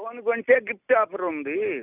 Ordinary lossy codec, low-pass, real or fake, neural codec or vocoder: none; 3.6 kHz; real; none